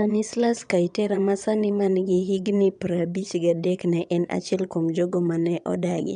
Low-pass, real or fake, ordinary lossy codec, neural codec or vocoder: 9.9 kHz; fake; none; vocoder, 22.05 kHz, 80 mel bands, Vocos